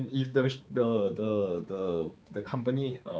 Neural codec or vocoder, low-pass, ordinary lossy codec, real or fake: codec, 16 kHz, 4 kbps, X-Codec, HuBERT features, trained on balanced general audio; none; none; fake